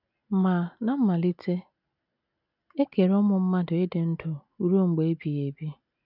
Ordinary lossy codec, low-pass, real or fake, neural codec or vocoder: none; 5.4 kHz; real; none